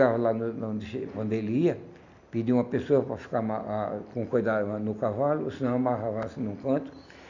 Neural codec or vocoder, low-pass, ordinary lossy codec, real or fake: none; 7.2 kHz; none; real